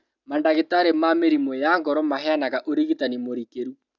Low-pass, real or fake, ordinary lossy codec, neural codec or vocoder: 7.2 kHz; real; none; none